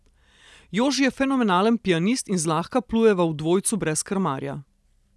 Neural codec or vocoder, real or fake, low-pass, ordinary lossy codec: none; real; none; none